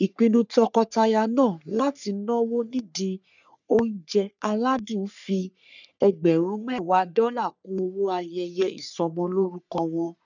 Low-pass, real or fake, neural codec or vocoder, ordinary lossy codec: 7.2 kHz; fake; codec, 44.1 kHz, 3.4 kbps, Pupu-Codec; none